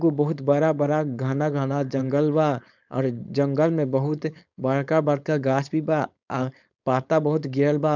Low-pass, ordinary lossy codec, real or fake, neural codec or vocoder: 7.2 kHz; none; fake; codec, 16 kHz, 4.8 kbps, FACodec